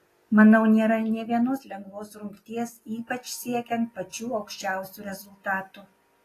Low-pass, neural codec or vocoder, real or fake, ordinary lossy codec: 14.4 kHz; none; real; AAC, 48 kbps